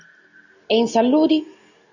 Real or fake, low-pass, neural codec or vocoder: real; 7.2 kHz; none